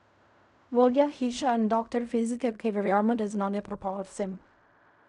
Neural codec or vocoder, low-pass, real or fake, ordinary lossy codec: codec, 16 kHz in and 24 kHz out, 0.4 kbps, LongCat-Audio-Codec, fine tuned four codebook decoder; 10.8 kHz; fake; none